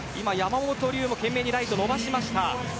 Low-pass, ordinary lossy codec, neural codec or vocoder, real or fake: none; none; none; real